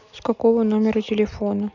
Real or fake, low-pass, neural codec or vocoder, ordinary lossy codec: real; 7.2 kHz; none; none